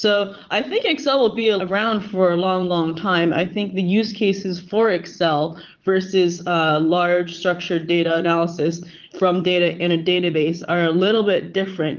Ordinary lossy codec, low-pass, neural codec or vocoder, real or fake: Opus, 32 kbps; 7.2 kHz; vocoder, 22.05 kHz, 80 mel bands, Vocos; fake